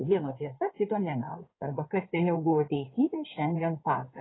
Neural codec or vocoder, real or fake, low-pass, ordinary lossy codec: codec, 16 kHz in and 24 kHz out, 2.2 kbps, FireRedTTS-2 codec; fake; 7.2 kHz; AAC, 16 kbps